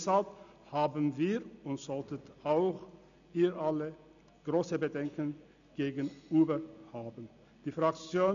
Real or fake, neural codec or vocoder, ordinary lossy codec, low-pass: real; none; none; 7.2 kHz